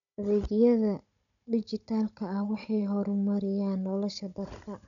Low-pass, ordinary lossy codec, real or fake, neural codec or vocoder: 7.2 kHz; MP3, 96 kbps; fake; codec, 16 kHz, 16 kbps, FunCodec, trained on Chinese and English, 50 frames a second